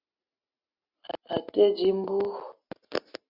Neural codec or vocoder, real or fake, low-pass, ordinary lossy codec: none; real; 5.4 kHz; Opus, 64 kbps